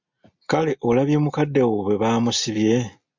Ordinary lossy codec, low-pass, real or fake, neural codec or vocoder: MP3, 64 kbps; 7.2 kHz; real; none